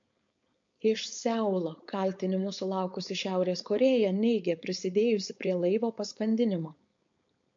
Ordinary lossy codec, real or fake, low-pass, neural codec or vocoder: MP3, 48 kbps; fake; 7.2 kHz; codec, 16 kHz, 4.8 kbps, FACodec